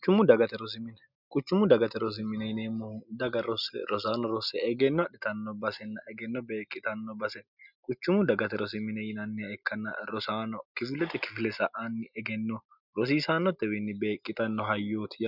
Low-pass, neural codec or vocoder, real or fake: 5.4 kHz; none; real